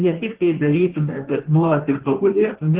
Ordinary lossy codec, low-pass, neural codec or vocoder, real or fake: Opus, 16 kbps; 3.6 kHz; codec, 16 kHz in and 24 kHz out, 1.1 kbps, FireRedTTS-2 codec; fake